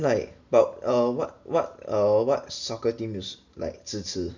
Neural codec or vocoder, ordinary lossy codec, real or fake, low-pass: none; none; real; 7.2 kHz